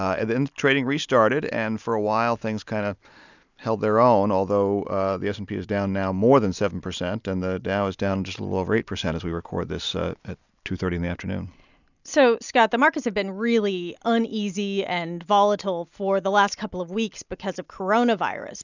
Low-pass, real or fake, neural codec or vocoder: 7.2 kHz; real; none